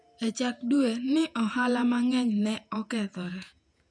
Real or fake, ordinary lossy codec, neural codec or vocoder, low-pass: fake; none; vocoder, 48 kHz, 128 mel bands, Vocos; 9.9 kHz